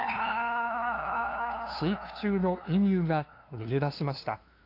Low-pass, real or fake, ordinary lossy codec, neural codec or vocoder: 5.4 kHz; fake; AAC, 32 kbps; codec, 16 kHz, 2 kbps, FunCodec, trained on LibriTTS, 25 frames a second